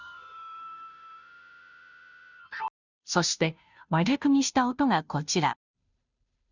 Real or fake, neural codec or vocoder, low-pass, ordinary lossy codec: fake; codec, 16 kHz, 0.5 kbps, FunCodec, trained on Chinese and English, 25 frames a second; 7.2 kHz; none